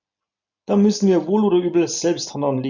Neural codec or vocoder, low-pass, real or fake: none; 7.2 kHz; real